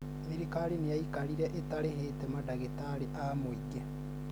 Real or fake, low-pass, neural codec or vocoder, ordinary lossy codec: real; none; none; none